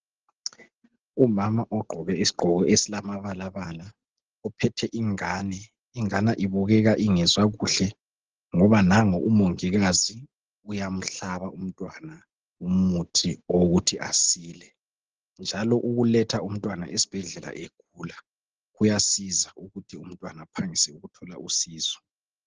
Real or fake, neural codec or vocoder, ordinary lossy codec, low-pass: real; none; Opus, 16 kbps; 7.2 kHz